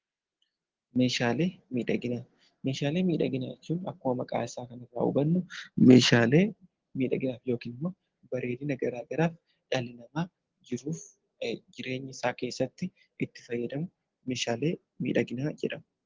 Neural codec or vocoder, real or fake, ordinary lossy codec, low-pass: none; real; Opus, 16 kbps; 7.2 kHz